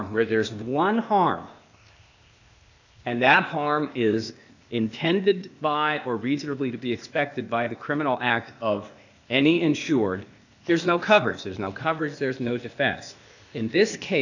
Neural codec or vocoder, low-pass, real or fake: codec, 16 kHz, 0.8 kbps, ZipCodec; 7.2 kHz; fake